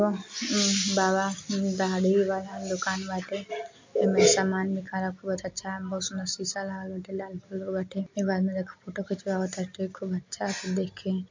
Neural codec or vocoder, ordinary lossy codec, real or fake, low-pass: none; MP3, 48 kbps; real; 7.2 kHz